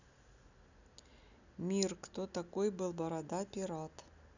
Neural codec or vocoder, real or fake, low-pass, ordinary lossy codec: none; real; 7.2 kHz; none